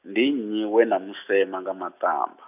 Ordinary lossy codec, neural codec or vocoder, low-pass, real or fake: none; none; 3.6 kHz; real